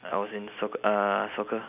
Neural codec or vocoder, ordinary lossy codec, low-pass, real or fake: none; none; 3.6 kHz; real